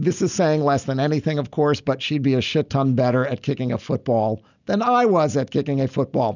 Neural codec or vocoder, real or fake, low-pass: none; real; 7.2 kHz